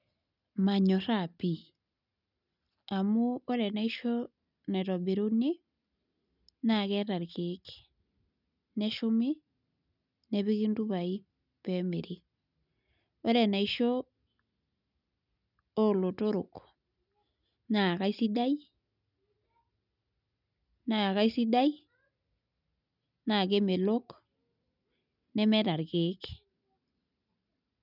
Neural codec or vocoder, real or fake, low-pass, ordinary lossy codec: none; real; 5.4 kHz; none